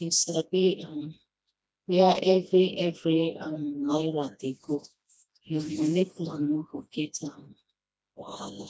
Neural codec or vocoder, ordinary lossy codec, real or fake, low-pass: codec, 16 kHz, 1 kbps, FreqCodec, smaller model; none; fake; none